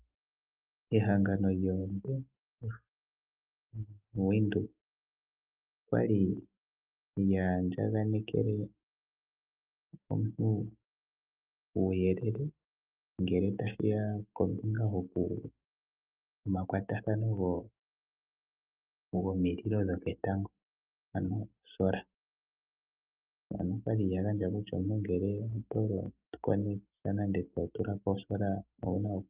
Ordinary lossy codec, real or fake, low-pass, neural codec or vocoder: Opus, 32 kbps; real; 3.6 kHz; none